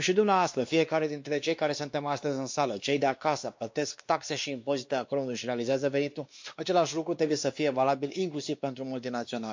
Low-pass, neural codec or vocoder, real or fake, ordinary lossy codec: 7.2 kHz; codec, 16 kHz, 2 kbps, X-Codec, WavLM features, trained on Multilingual LibriSpeech; fake; MP3, 48 kbps